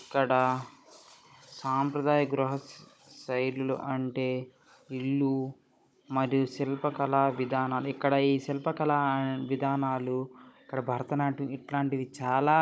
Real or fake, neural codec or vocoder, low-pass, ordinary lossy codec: fake; codec, 16 kHz, 16 kbps, FunCodec, trained on Chinese and English, 50 frames a second; none; none